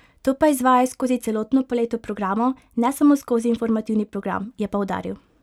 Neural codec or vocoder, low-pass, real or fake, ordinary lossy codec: none; 19.8 kHz; real; none